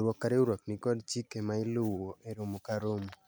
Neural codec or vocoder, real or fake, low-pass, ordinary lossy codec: none; real; none; none